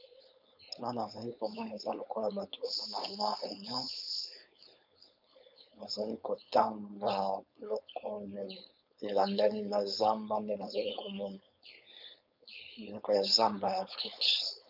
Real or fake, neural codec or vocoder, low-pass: fake; codec, 16 kHz, 4.8 kbps, FACodec; 5.4 kHz